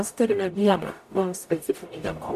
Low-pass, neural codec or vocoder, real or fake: 14.4 kHz; codec, 44.1 kHz, 0.9 kbps, DAC; fake